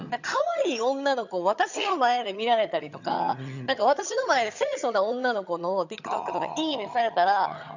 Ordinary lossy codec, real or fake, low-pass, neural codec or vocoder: none; fake; 7.2 kHz; vocoder, 22.05 kHz, 80 mel bands, HiFi-GAN